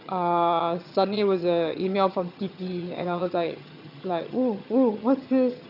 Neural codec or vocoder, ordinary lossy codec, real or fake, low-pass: vocoder, 22.05 kHz, 80 mel bands, HiFi-GAN; none; fake; 5.4 kHz